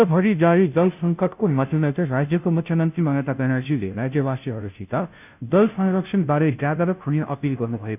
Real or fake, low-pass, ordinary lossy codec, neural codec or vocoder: fake; 3.6 kHz; none; codec, 16 kHz, 0.5 kbps, FunCodec, trained on Chinese and English, 25 frames a second